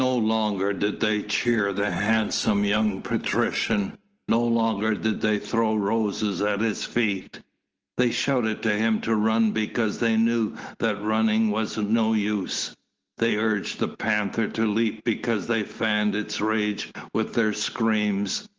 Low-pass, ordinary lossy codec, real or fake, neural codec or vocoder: 7.2 kHz; Opus, 16 kbps; real; none